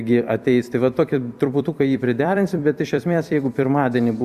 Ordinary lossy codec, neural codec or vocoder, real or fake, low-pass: Opus, 64 kbps; vocoder, 44.1 kHz, 128 mel bands every 256 samples, BigVGAN v2; fake; 14.4 kHz